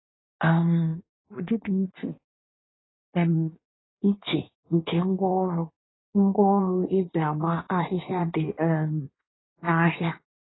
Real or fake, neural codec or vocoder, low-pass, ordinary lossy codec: fake; codec, 16 kHz in and 24 kHz out, 1.1 kbps, FireRedTTS-2 codec; 7.2 kHz; AAC, 16 kbps